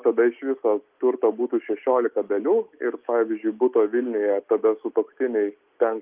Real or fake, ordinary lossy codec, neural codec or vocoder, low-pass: real; Opus, 24 kbps; none; 3.6 kHz